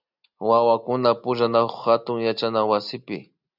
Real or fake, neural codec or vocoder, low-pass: real; none; 5.4 kHz